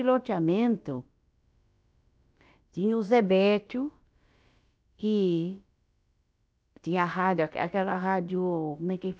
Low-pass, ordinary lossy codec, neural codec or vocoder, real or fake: none; none; codec, 16 kHz, about 1 kbps, DyCAST, with the encoder's durations; fake